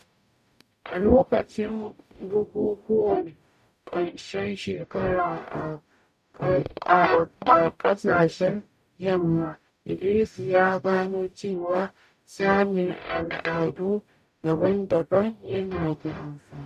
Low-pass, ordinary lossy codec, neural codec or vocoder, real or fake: 14.4 kHz; none; codec, 44.1 kHz, 0.9 kbps, DAC; fake